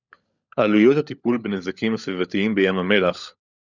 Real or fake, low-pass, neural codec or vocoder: fake; 7.2 kHz; codec, 16 kHz, 16 kbps, FunCodec, trained on LibriTTS, 50 frames a second